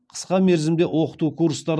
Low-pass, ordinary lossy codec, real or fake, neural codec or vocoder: none; none; real; none